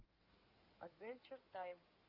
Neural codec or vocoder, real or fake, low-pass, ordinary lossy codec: codec, 16 kHz in and 24 kHz out, 2.2 kbps, FireRedTTS-2 codec; fake; 5.4 kHz; Opus, 32 kbps